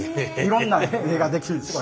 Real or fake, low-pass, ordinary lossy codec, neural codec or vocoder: real; none; none; none